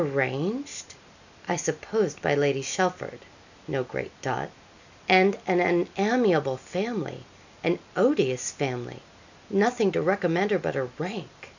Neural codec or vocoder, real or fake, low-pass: none; real; 7.2 kHz